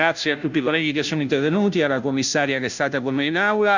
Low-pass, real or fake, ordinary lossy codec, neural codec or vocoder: 7.2 kHz; fake; none; codec, 16 kHz, 0.5 kbps, FunCodec, trained on Chinese and English, 25 frames a second